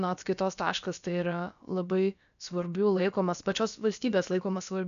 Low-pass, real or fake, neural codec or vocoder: 7.2 kHz; fake; codec, 16 kHz, about 1 kbps, DyCAST, with the encoder's durations